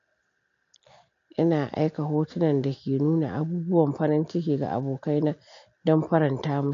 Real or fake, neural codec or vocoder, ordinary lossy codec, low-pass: real; none; MP3, 48 kbps; 7.2 kHz